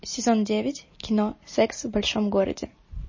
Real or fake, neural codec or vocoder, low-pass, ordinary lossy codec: real; none; 7.2 kHz; MP3, 32 kbps